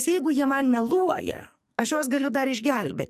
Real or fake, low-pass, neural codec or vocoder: fake; 14.4 kHz; codec, 44.1 kHz, 2.6 kbps, SNAC